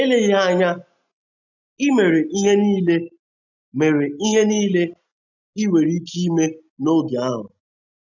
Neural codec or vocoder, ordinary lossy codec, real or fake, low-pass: none; none; real; 7.2 kHz